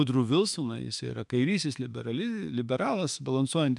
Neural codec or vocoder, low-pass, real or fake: autoencoder, 48 kHz, 32 numbers a frame, DAC-VAE, trained on Japanese speech; 10.8 kHz; fake